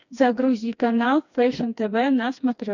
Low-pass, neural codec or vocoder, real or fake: 7.2 kHz; codec, 16 kHz, 2 kbps, FreqCodec, smaller model; fake